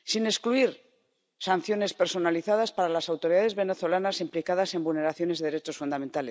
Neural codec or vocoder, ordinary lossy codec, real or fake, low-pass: none; none; real; none